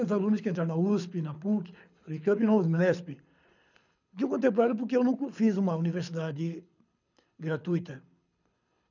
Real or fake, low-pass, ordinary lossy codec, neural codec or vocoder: fake; 7.2 kHz; none; codec, 24 kHz, 6 kbps, HILCodec